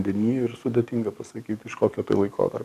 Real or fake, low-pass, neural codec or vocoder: fake; 14.4 kHz; codec, 44.1 kHz, 7.8 kbps, DAC